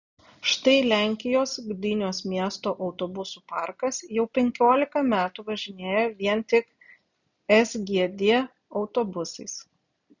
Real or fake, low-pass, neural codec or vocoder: real; 7.2 kHz; none